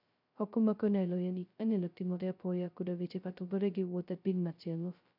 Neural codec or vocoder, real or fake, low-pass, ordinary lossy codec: codec, 16 kHz, 0.2 kbps, FocalCodec; fake; 5.4 kHz; none